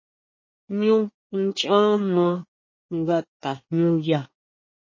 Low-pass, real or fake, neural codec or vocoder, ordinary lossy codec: 7.2 kHz; fake; codec, 24 kHz, 1 kbps, SNAC; MP3, 32 kbps